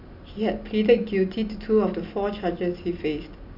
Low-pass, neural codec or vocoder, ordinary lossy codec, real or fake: 5.4 kHz; none; none; real